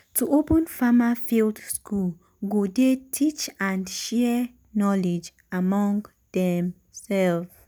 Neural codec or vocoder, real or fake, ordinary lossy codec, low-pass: none; real; none; none